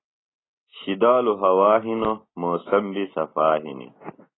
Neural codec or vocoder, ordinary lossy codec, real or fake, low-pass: none; AAC, 16 kbps; real; 7.2 kHz